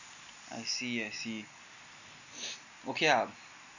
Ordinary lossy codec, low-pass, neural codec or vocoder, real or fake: none; 7.2 kHz; none; real